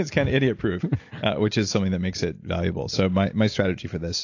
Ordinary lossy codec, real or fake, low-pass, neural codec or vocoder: AAC, 48 kbps; real; 7.2 kHz; none